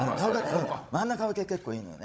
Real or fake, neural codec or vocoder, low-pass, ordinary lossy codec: fake; codec, 16 kHz, 16 kbps, FunCodec, trained on Chinese and English, 50 frames a second; none; none